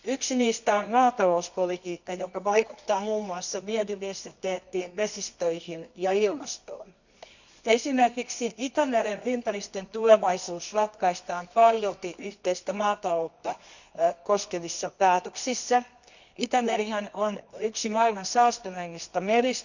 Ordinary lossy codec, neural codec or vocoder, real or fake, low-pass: none; codec, 24 kHz, 0.9 kbps, WavTokenizer, medium music audio release; fake; 7.2 kHz